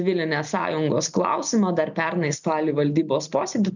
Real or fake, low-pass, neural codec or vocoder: real; 7.2 kHz; none